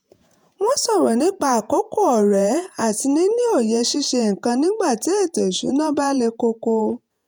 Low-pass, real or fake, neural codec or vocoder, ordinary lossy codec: none; fake; vocoder, 48 kHz, 128 mel bands, Vocos; none